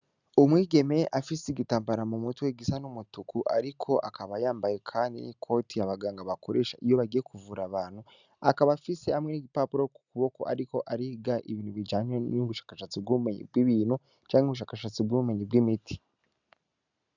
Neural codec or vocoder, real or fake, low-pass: none; real; 7.2 kHz